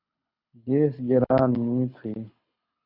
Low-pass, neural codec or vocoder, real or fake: 5.4 kHz; codec, 24 kHz, 6 kbps, HILCodec; fake